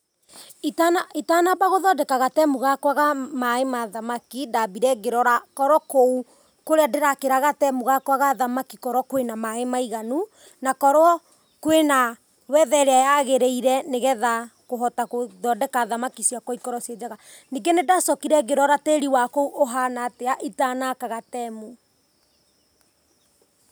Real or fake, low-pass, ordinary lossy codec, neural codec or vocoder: real; none; none; none